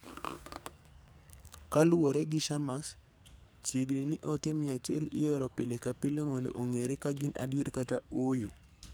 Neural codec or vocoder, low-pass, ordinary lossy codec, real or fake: codec, 44.1 kHz, 2.6 kbps, SNAC; none; none; fake